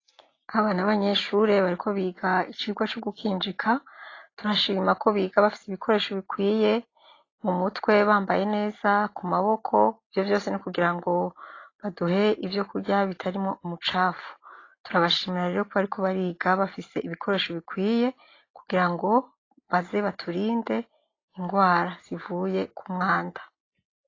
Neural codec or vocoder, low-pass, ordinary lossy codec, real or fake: none; 7.2 kHz; AAC, 32 kbps; real